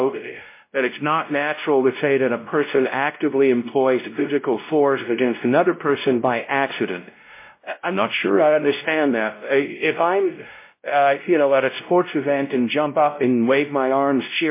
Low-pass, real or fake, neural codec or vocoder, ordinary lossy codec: 3.6 kHz; fake; codec, 16 kHz, 0.5 kbps, X-Codec, WavLM features, trained on Multilingual LibriSpeech; MP3, 24 kbps